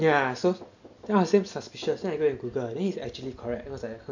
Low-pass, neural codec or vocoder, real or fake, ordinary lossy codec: 7.2 kHz; none; real; none